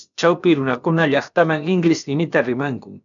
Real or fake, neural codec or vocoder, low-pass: fake; codec, 16 kHz, about 1 kbps, DyCAST, with the encoder's durations; 7.2 kHz